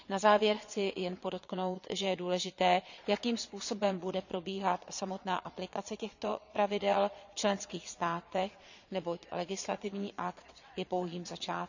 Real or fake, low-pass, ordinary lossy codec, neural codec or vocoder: fake; 7.2 kHz; none; vocoder, 22.05 kHz, 80 mel bands, Vocos